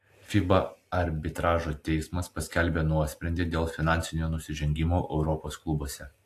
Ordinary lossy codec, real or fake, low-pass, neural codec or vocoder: AAC, 64 kbps; real; 14.4 kHz; none